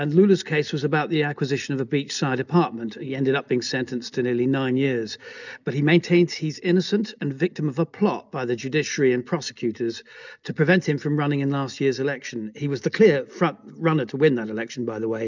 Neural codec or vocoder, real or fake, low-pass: none; real; 7.2 kHz